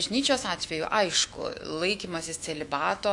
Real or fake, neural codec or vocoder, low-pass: fake; autoencoder, 48 kHz, 128 numbers a frame, DAC-VAE, trained on Japanese speech; 10.8 kHz